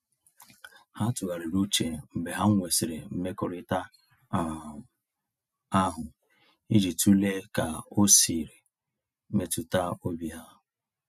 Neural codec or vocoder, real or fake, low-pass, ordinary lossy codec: none; real; 14.4 kHz; none